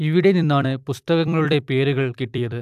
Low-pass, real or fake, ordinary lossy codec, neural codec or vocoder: 14.4 kHz; fake; AAC, 96 kbps; vocoder, 44.1 kHz, 128 mel bands every 256 samples, BigVGAN v2